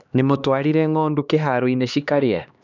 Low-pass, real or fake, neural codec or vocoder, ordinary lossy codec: 7.2 kHz; fake; codec, 16 kHz, 2 kbps, X-Codec, HuBERT features, trained on LibriSpeech; none